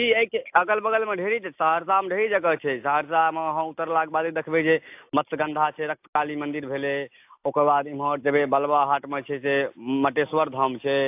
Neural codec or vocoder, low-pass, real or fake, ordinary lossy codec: none; 3.6 kHz; real; AAC, 32 kbps